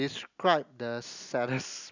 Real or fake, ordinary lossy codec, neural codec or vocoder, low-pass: real; none; none; 7.2 kHz